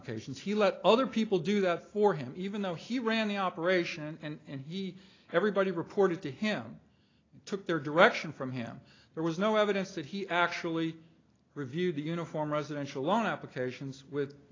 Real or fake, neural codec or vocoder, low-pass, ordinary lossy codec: real; none; 7.2 kHz; AAC, 32 kbps